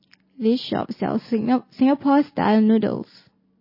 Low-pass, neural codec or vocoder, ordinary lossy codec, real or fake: 5.4 kHz; none; MP3, 24 kbps; real